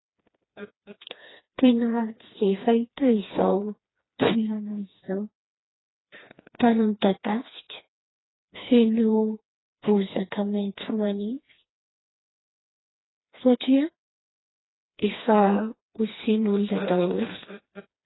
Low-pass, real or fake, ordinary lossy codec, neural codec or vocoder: 7.2 kHz; fake; AAC, 16 kbps; codec, 16 kHz, 2 kbps, FreqCodec, smaller model